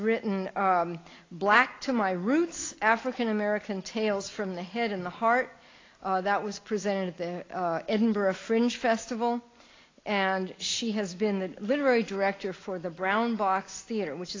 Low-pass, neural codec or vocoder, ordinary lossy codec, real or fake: 7.2 kHz; none; AAC, 32 kbps; real